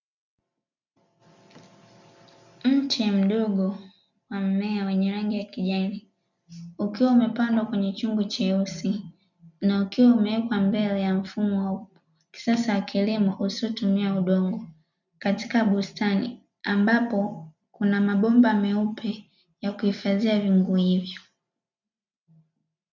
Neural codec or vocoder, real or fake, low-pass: none; real; 7.2 kHz